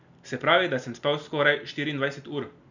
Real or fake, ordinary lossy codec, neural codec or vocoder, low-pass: real; none; none; 7.2 kHz